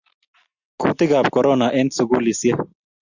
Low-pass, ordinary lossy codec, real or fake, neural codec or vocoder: 7.2 kHz; Opus, 64 kbps; real; none